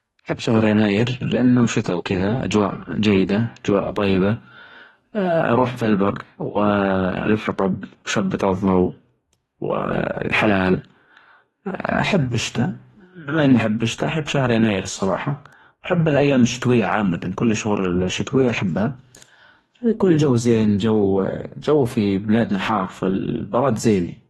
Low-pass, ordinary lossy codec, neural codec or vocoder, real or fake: 19.8 kHz; AAC, 32 kbps; codec, 44.1 kHz, 2.6 kbps, DAC; fake